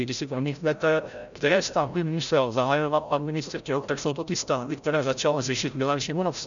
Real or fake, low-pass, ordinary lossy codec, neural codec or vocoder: fake; 7.2 kHz; MP3, 96 kbps; codec, 16 kHz, 0.5 kbps, FreqCodec, larger model